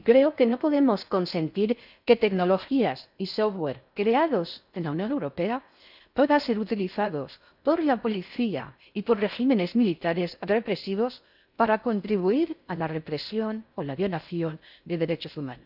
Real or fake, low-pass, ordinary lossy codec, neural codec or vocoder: fake; 5.4 kHz; none; codec, 16 kHz in and 24 kHz out, 0.6 kbps, FocalCodec, streaming, 4096 codes